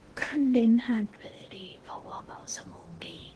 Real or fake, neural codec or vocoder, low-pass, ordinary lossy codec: fake; codec, 16 kHz in and 24 kHz out, 0.8 kbps, FocalCodec, streaming, 65536 codes; 10.8 kHz; Opus, 16 kbps